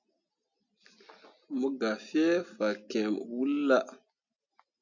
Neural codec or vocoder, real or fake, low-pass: none; real; 7.2 kHz